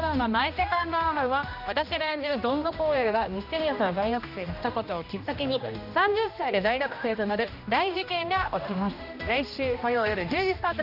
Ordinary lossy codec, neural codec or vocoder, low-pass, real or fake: none; codec, 16 kHz, 1 kbps, X-Codec, HuBERT features, trained on general audio; 5.4 kHz; fake